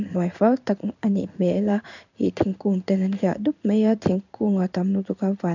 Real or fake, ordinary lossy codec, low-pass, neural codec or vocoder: fake; none; 7.2 kHz; codec, 16 kHz in and 24 kHz out, 1 kbps, XY-Tokenizer